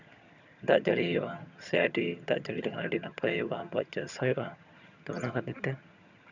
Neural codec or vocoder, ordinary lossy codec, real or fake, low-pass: vocoder, 22.05 kHz, 80 mel bands, HiFi-GAN; none; fake; 7.2 kHz